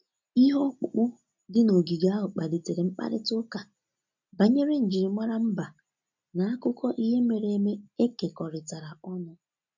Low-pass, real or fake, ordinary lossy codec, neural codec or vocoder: 7.2 kHz; real; none; none